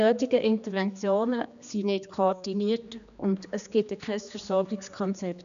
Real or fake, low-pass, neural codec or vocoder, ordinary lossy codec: fake; 7.2 kHz; codec, 16 kHz, 2 kbps, X-Codec, HuBERT features, trained on general audio; none